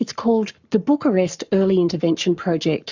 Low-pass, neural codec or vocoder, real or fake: 7.2 kHz; codec, 44.1 kHz, 7.8 kbps, Pupu-Codec; fake